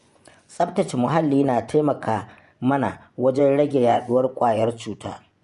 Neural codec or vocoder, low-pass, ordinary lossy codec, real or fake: vocoder, 24 kHz, 100 mel bands, Vocos; 10.8 kHz; none; fake